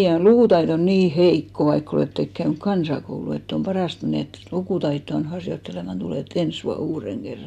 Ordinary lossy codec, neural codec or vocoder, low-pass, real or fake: none; none; 14.4 kHz; real